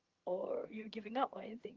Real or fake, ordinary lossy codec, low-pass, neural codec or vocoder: fake; Opus, 32 kbps; 7.2 kHz; vocoder, 22.05 kHz, 80 mel bands, HiFi-GAN